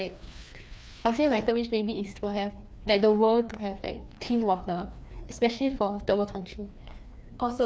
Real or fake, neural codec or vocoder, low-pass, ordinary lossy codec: fake; codec, 16 kHz, 2 kbps, FreqCodec, larger model; none; none